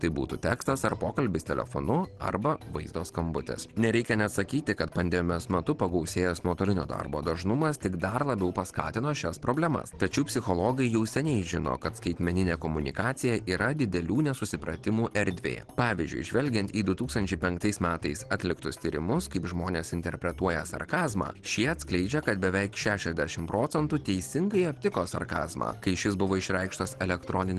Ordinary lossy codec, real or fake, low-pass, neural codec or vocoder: Opus, 16 kbps; real; 10.8 kHz; none